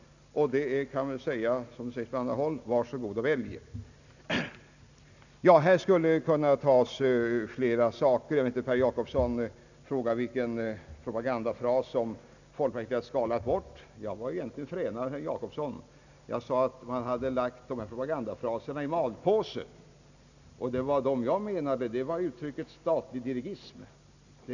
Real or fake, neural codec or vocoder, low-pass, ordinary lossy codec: real; none; 7.2 kHz; none